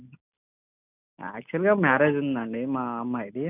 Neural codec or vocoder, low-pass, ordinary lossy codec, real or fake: none; 3.6 kHz; none; real